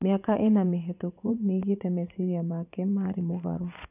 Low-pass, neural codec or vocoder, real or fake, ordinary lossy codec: 3.6 kHz; vocoder, 44.1 kHz, 80 mel bands, Vocos; fake; none